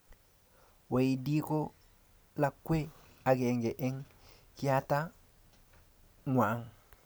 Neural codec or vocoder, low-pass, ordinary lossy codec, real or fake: none; none; none; real